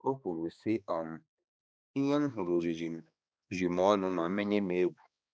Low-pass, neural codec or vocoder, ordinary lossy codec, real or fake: none; codec, 16 kHz, 2 kbps, X-Codec, HuBERT features, trained on balanced general audio; none; fake